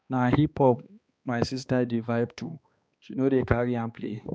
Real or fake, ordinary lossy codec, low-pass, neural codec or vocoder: fake; none; none; codec, 16 kHz, 2 kbps, X-Codec, HuBERT features, trained on balanced general audio